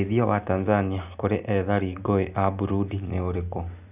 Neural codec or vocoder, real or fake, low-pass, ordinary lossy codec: none; real; 3.6 kHz; none